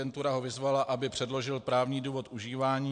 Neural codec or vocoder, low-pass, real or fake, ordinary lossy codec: none; 9.9 kHz; real; MP3, 64 kbps